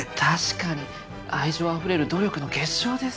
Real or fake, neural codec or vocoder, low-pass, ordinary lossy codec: real; none; none; none